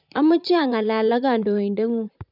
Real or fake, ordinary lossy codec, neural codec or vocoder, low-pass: fake; none; vocoder, 44.1 kHz, 128 mel bands, Pupu-Vocoder; 5.4 kHz